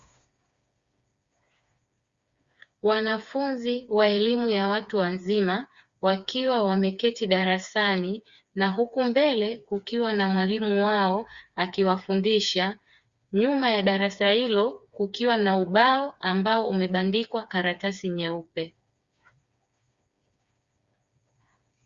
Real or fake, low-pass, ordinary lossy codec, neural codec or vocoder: fake; 7.2 kHz; Opus, 64 kbps; codec, 16 kHz, 4 kbps, FreqCodec, smaller model